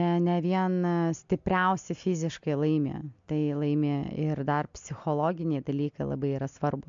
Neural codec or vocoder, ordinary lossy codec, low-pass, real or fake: none; MP3, 64 kbps; 7.2 kHz; real